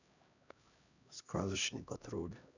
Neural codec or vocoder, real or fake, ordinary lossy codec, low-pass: codec, 16 kHz, 1 kbps, X-Codec, HuBERT features, trained on LibriSpeech; fake; none; 7.2 kHz